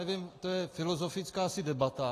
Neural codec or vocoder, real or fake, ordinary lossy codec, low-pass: none; real; AAC, 48 kbps; 14.4 kHz